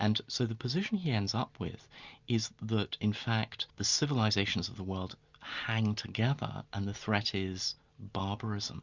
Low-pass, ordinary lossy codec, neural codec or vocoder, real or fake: 7.2 kHz; Opus, 64 kbps; none; real